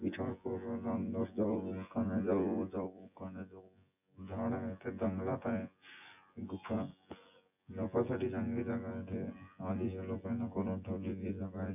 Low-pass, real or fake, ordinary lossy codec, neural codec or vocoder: 3.6 kHz; fake; none; vocoder, 24 kHz, 100 mel bands, Vocos